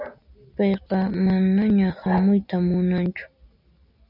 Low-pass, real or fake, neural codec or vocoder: 5.4 kHz; real; none